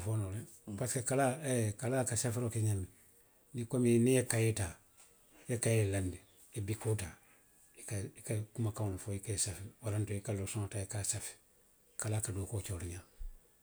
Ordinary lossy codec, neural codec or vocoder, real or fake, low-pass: none; none; real; none